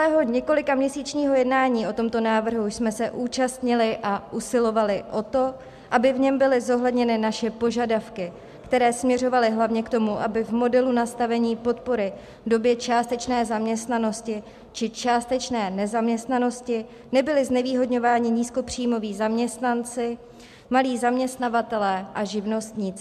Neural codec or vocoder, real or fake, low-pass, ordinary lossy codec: none; real; 14.4 kHz; MP3, 96 kbps